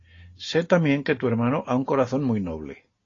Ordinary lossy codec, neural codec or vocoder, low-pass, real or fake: AAC, 32 kbps; none; 7.2 kHz; real